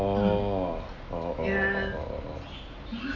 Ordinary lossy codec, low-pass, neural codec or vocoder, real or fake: none; 7.2 kHz; none; real